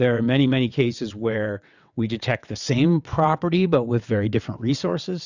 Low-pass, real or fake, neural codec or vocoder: 7.2 kHz; fake; vocoder, 22.05 kHz, 80 mel bands, WaveNeXt